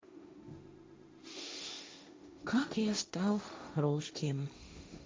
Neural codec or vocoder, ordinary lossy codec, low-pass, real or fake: codec, 16 kHz, 1.1 kbps, Voila-Tokenizer; none; none; fake